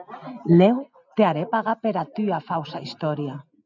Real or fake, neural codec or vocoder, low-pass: real; none; 7.2 kHz